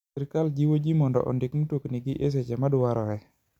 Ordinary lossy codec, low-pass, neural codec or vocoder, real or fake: none; 19.8 kHz; none; real